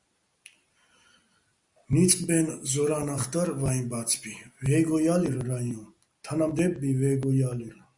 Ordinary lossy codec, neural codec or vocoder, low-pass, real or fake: Opus, 64 kbps; none; 10.8 kHz; real